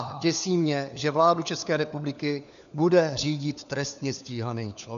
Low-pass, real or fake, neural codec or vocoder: 7.2 kHz; fake; codec, 16 kHz, 4 kbps, FunCodec, trained on LibriTTS, 50 frames a second